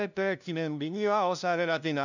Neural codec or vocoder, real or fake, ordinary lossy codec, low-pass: codec, 16 kHz, 0.5 kbps, FunCodec, trained on LibriTTS, 25 frames a second; fake; none; 7.2 kHz